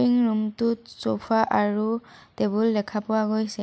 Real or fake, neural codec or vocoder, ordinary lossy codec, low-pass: real; none; none; none